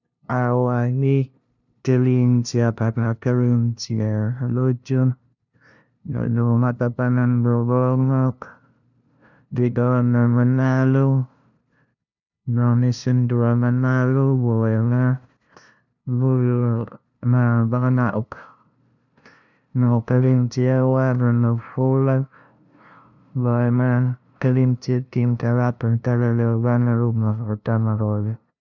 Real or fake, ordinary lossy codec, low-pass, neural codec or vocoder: fake; none; 7.2 kHz; codec, 16 kHz, 0.5 kbps, FunCodec, trained on LibriTTS, 25 frames a second